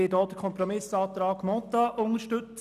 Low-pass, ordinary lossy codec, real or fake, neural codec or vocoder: 14.4 kHz; none; real; none